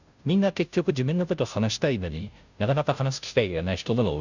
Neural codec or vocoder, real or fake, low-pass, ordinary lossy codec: codec, 16 kHz, 0.5 kbps, FunCodec, trained on Chinese and English, 25 frames a second; fake; 7.2 kHz; none